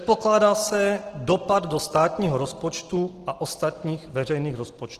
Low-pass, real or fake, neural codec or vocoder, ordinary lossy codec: 14.4 kHz; real; none; Opus, 16 kbps